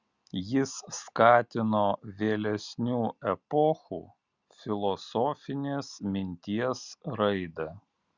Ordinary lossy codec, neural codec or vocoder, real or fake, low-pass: Opus, 64 kbps; none; real; 7.2 kHz